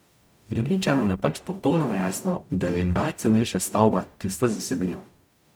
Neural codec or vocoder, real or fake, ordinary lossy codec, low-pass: codec, 44.1 kHz, 0.9 kbps, DAC; fake; none; none